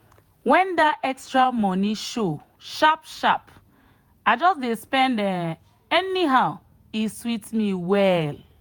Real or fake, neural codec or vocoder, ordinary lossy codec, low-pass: fake; vocoder, 48 kHz, 128 mel bands, Vocos; none; none